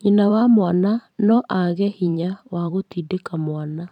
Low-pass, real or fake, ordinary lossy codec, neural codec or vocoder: 19.8 kHz; fake; none; vocoder, 44.1 kHz, 128 mel bands every 512 samples, BigVGAN v2